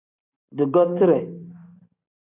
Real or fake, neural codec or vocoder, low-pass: fake; vocoder, 44.1 kHz, 128 mel bands every 512 samples, BigVGAN v2; 3.6 kHz